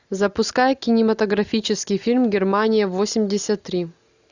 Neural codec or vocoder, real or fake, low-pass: none; real; 7.2 kHz